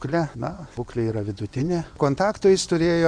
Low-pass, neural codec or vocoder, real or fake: 9.9 kHz; vocoder, 24 kHz, 100 mel bands, Vocos; fake